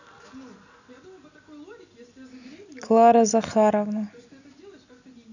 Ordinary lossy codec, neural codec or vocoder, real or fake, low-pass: none; none; real; 7.2 kHz